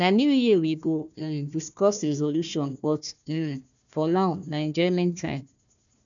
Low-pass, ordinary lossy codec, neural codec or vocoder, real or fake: 7.2 kHz; none; codec, 16 kHz, 1 kbps, FunCodec, trained on Chinese and English, 50 frames a second; fake